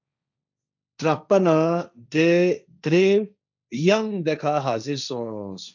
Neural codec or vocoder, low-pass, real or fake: codec, 16 kHz, 1.1 kbps, Voila-Tokenizer; 7.2 kHz; fake